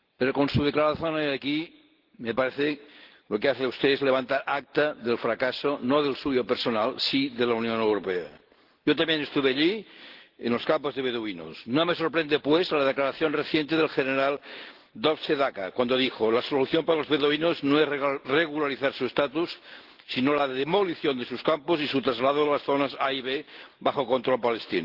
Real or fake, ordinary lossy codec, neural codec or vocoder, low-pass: real; Opus, 16 kbps; none; 5.4 kHz